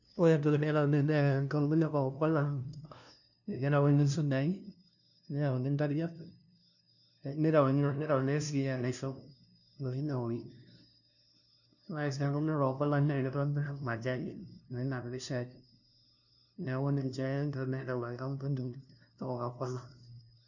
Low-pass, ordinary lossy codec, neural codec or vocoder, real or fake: 7.2 kHz; none; codec, 16 kHz, 0.5 kbps, FunCodec, trained on LibriTTS, 25 frames a second; fake